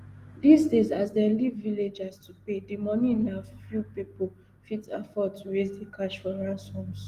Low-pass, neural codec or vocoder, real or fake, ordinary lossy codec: 14.4 kHz; none; real; Opus, 24 kbps